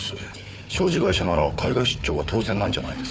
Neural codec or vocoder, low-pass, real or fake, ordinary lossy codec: codec, 16 kHz, 4 kbps, FunCodec, trained on Chinese and English, 50 frames a second; none; fake; none